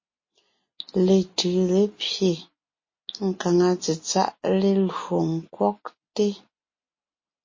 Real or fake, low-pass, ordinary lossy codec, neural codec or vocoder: real; 7.2 kHz; MP3, 32 kbps; none